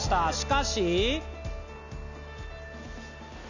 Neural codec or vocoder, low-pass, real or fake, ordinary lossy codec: none; 7.2 kHz; real; none